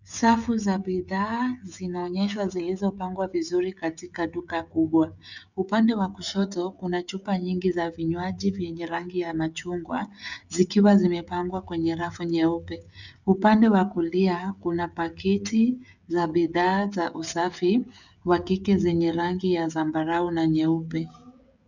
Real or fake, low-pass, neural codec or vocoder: fake; 7.2 kHz; codec, 16 kHz, 16 kbps, FreqCodec, smaller model